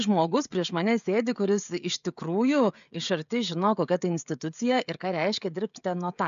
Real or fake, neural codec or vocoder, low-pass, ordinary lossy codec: fake; codec, 16 kHz, 16 kbps, FreqCodec, smaller model; 7.2 kHz; AAC, 96 kbps